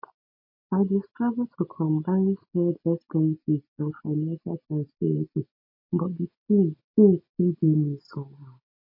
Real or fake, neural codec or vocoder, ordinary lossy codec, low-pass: real; none; MP3, 32 kbps; 5.4 kHz